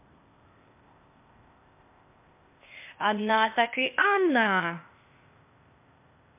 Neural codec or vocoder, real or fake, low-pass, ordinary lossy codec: codec, 16 kHz, 0.8 kbps, ZipCodec; fake; 3.6 kHz; MP3, 32 kbps